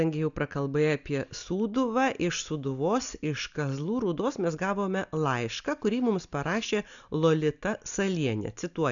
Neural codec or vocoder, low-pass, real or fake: none; 7.2 kHz; real